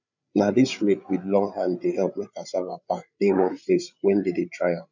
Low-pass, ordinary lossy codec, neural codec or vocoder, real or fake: 7.2 kHz; none; codec, 16 kHz, 8 kbps, FreqCodec, larger model; fake